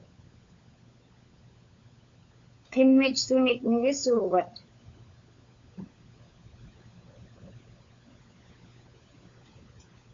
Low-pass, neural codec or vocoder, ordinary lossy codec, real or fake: 7.2 kHz; codec, 16 kHz, 16 kbps, FunCodec, trained on LibriTTS, 50 frames a second; MP3, 48 kbps; fake